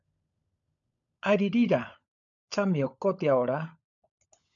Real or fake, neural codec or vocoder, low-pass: fake; codec, 16 kHz, 16 kbps, FunCodec, trained on LibriTTS, 50 frames a second; 7.2 kHz